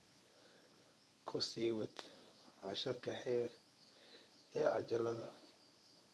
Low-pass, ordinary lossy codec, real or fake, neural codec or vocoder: none; none; fake; codec, 24 kHz, 0.9 kbps, WavTokenizer, medium speech release version 1